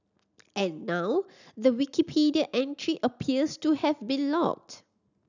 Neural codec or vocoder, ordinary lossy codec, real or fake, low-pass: none; none; real; 7.2 kHz